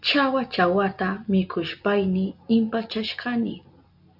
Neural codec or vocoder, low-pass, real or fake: none; 5.4 kHz; real